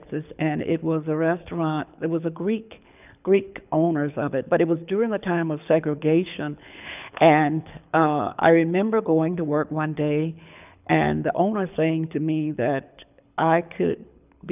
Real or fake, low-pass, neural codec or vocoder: fake; 3.6 kHz; codec, 24 kHz, 6 kbps, HILCodec